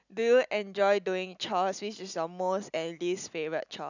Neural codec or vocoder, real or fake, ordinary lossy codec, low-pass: none; real; none; 7.2 kHz